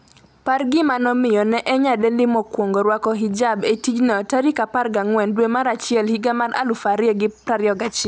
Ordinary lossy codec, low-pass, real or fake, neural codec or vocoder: none; none; real; none